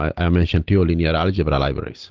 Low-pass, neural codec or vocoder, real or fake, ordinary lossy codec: 7.2 kHz; none; real; Opus, 16 kbps